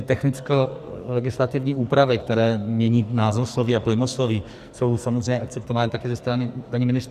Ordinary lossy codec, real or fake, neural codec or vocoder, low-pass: Opus, 64 kbps; fake; codec, 44.1 kHz, 2.6 kbps, SNAC; 14.4 kHz